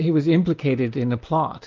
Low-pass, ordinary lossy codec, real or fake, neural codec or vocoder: 7.2 kHz; Opus, 32 kbps; real; none